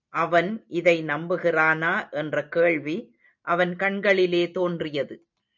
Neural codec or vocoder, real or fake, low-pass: none; real; 7.2 kHz